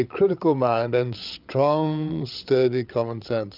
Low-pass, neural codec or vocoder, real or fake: 5.4 kHz; codec, 16 kHz, 8 kbps, FreqCodec, larger model; fake